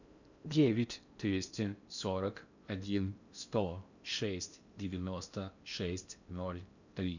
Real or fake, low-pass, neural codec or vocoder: fake; 7.2 kHz; codec, 16 kHz in and 24 kHz out, 0.6 kbps, FocalCodec, streaming, 2048 codes